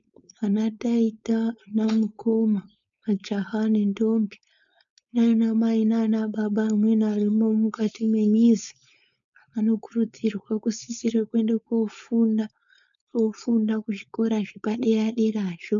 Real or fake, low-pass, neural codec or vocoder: fake; 7.2 kHz; codec, 16 kHz, 4.8 kbps, FACodec